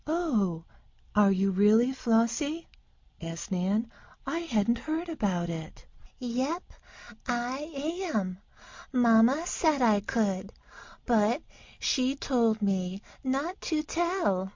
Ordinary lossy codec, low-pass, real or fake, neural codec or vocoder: MP3, 48 kbps; 7.2 kHz; real; none